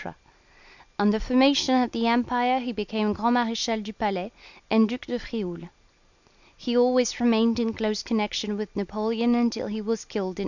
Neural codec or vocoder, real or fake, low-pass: none; real; 7.2 kHz